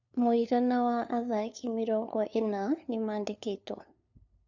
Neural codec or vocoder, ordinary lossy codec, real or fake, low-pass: codec, 16 kHz, 2 kbps, FunCodec, trained on LibriTTS, 25 frames a second; none; fake; 7.2 kHz